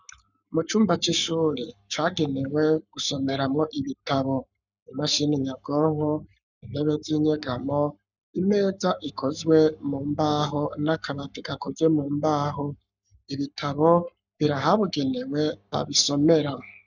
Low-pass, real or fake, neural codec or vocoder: 7.2 kHz; fake; codec, 44.1 kHz, 7.8 kbps, Pupu-Codec